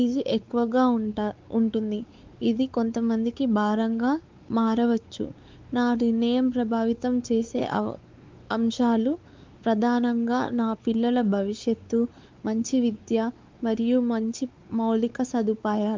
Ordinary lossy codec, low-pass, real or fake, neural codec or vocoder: Opus, 24 kbps; 7.2 kHz; fake; codec, 16 kHz, 4 kbps, X-Codec, WavLM features, trained on Multilingual LibriSpeech